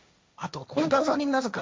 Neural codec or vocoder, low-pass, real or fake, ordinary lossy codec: codec, 16 kHz, 1.1 kbps, Voila-Tokenizer; none; fake; none